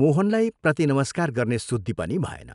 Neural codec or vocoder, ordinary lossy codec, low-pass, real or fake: none; none; 10.8 kHz; real